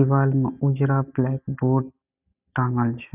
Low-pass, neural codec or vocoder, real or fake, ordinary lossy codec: 3.6 kHz; none; real; none